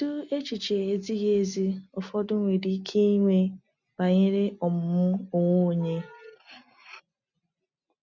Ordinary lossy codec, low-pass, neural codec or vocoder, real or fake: none; 7.2 kHz; none; real